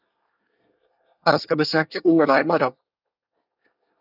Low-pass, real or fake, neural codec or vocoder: 5.4 kHz; fake; codec, 24 kHz, 1 kbps, SNAC